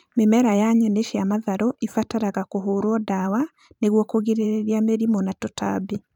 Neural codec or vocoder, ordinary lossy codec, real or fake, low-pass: vocoder, 44.1 kHz, 128 mel bands every 512 samples, BigVGAN v2; none; fake; 19.8 kHz